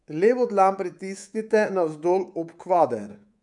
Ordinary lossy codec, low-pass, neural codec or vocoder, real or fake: none; 10.8 kHz; codec, 24 kHz, 3.1 kbps, DualCodec; fake